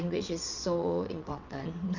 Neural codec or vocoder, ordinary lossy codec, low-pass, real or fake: vocoder, 22.05 kHz, 80 mel bands, WaveNeXt; none; 7.2 kHz; fake